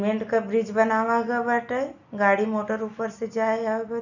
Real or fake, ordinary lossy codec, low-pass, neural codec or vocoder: real; none; 7.2 kHz; none